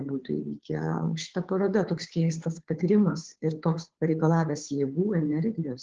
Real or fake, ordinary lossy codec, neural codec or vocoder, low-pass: fake; Opus, 16 kbps; codec, 16 kHz, 2 kbps, FunCodec, trained on Chinese and English, 25 frames a second; 7.2 kHz